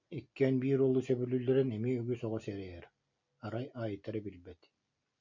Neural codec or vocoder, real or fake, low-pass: none; real; 7.2 kHz